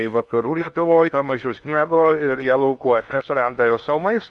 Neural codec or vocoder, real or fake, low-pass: codec, 16 kHz in and 24 kHz out, 0.8 kbps, FocalCodec, streaming, 65536 codes; fake; 10.8 kHz